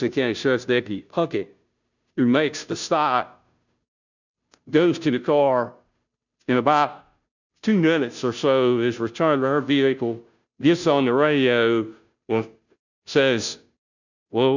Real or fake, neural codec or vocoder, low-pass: fake; codec, 16 kHz, 0.5 kbps, FunCodec, trained on Chinese and English, 25 frames a second; 7.2 kHz